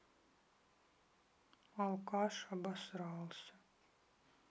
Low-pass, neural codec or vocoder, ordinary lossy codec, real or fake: none; none; none; real